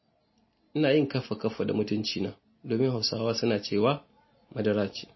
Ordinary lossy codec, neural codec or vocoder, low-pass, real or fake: MP3, 24 kbps; none; 7.2 kHz; real